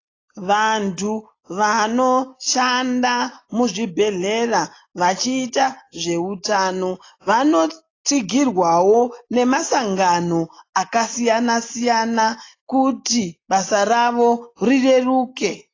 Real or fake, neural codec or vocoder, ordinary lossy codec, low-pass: real; none; AAC, 32 kbps; 7.2 kHz